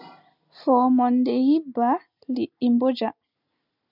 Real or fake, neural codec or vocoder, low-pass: real; none; 5.4 kHz